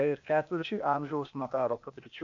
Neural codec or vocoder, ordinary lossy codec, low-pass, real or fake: codec, 16 kHz, 0.8 kbps, ZipCodec; AAC, 64 kbps; 7.2 kHz; fake